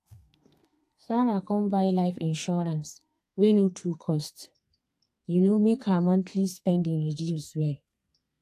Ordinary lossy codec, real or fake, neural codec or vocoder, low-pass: AAC, 64 kbps; fake; codec, 32 kHz, 1.9 kbps, SNAC; 14.4 kHz